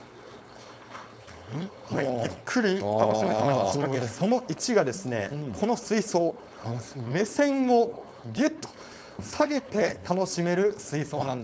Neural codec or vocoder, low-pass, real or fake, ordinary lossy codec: codec, 16 kHz, 4.8 kbps, FACodec; none; fake; none